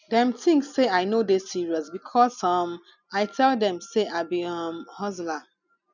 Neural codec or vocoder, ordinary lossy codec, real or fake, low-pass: none; none; real; 7.2 kHz